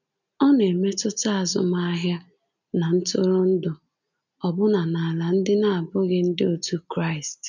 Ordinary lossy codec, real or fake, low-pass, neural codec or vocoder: none; real; 7.2 kHz; none